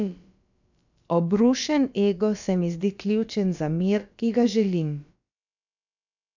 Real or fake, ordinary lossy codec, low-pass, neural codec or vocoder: fake; none; 7.2 kHz; codec, 16 kHz, about 1 kbps, DyCAST, with the encoder's durations